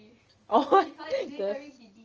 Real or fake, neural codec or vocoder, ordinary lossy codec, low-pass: real; none; Opus, 24 kbps; 7.2 kHz